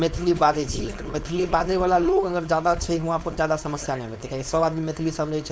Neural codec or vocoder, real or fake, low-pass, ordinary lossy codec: codec, 16 kHz, 4.8 kbps, FACodec; fake; none; none